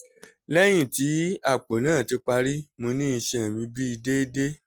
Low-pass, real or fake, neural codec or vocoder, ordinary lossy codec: 19.8 kHz; real; none; Opus, 24 kbps